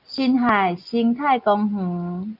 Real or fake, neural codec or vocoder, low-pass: real; none; 5.4 kHz